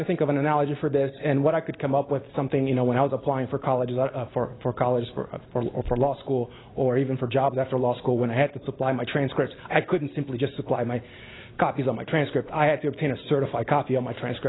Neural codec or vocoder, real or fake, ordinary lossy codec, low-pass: none; real; AAC, 16 kbps; 7.2 kHz